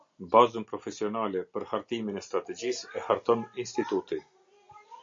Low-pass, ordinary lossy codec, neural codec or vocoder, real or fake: 7.2 kHz; MP3, 48 kbps; none; real